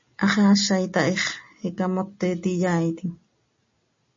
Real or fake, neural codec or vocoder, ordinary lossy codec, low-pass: real; none; MP3, 32 kbps; 7.2 kHz